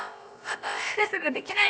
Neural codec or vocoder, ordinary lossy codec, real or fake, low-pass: codec, 16 kHz, about 1 kbps, DyCAST, with the encoder's durations; none; fake; none